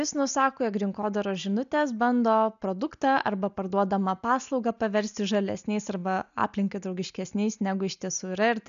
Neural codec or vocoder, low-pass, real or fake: none; 7.2 kHz; real